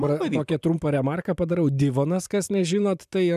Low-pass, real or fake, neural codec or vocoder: 14.4 kHz; fake; vocoder, 44.1 kHz, 128 mel bands every 512 samples, BigVGAN v2